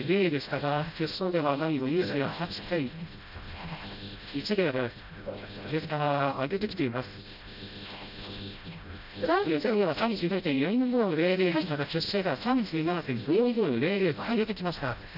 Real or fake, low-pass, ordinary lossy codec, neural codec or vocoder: fake; 5.4 kHz; none; codec, 16 kHz, 0.5 kbps, FreqCodec, smaller model